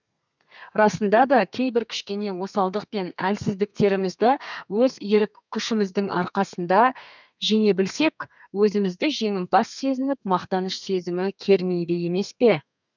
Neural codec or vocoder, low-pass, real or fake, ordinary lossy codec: codec, 32 kHz, 1.9 kbps, SNAC; 7.2 kHz; fake; none